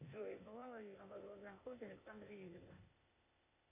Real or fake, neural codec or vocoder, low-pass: fake; codec, 16 kHz, 0.8 kbps, ZipCodec; 3.6 kHz